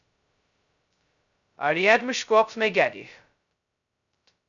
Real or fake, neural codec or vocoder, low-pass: fake; codec, 16 kHz, 0.2 kbps, FocalCodec; 7.2 kHz